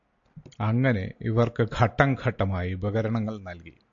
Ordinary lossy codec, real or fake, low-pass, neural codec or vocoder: AAC, 64 kbps; real; 7.2 kHz; none